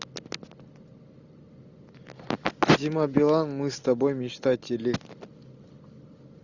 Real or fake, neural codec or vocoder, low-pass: real; none; 7.2 kHz